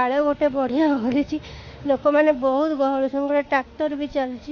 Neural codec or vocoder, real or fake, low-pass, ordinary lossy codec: autoencoder, 48 kHz, 32 numbers a frame, DAC-VAE, trained on Japanese speech; fake; 7.2 kHz; MP3, 48 kbps